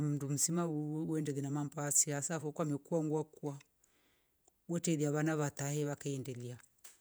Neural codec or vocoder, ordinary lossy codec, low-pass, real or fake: vocoder, 48 kHz, 128 mel bands, Vocos; none; none; fake